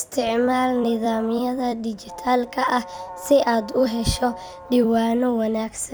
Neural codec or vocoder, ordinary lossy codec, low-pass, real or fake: vocoder, 44.1 kHz, 128 mel bands every 256 samples, BigVGAN v2; none; none; fake